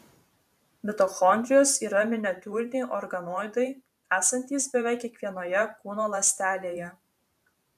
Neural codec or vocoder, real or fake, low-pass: vocoder, 44.1 kHz, 128 mel bands every 512 samples, BigVGAN v2; fake; 14.4 kHz